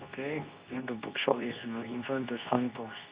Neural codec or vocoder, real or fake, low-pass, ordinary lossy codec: codec, 24 kHz, 0.9 kbps, WavTokenizer, medium speech release version 2; fake; 3.6 kHz; Opus, 64 kbps